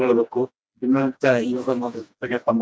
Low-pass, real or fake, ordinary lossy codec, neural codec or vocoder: none; fake; none; codec, 16 kHz, 1 kbps, FreqCodec, smaller model